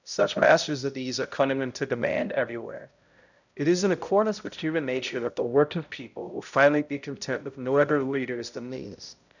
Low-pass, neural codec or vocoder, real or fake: 7.2 kHz; codec, 16 kHz, 0.5 kbps, X-Codec, HuBERT features, trained on balanced general audio; fake